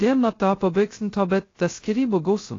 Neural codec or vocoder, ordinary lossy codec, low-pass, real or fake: codec, 16 kHz, 0.2 kbps, FocalCodec; AAC, 32 kbps; 7.2 kHz; fake